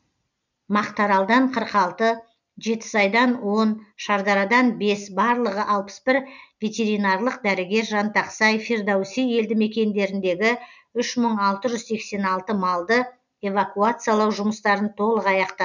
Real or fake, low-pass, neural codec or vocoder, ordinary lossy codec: real; 7.2 kHz; none; none